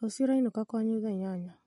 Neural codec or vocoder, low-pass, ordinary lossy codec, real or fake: none; 19.8 kHz; MP3, 48 kbps; real